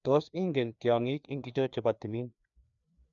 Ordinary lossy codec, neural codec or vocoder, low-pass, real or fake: none; codec, 16 kHz, 2 kbps, FreqCodec, larger model; 7.2 kHz; fake